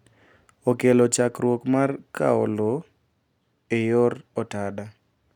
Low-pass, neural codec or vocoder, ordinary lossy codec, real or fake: 19.8 kHz; none; none; real